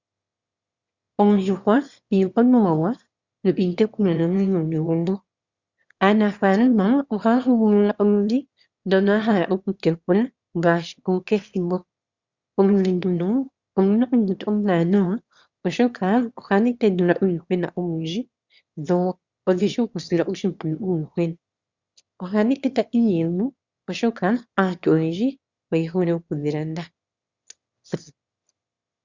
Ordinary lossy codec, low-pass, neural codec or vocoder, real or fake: Opus, 64 kbps; 7.2 kHz; autoencoder, 22.05 kHz, a latent of 192 numbers a frame, VITS, trained on one speaker; fake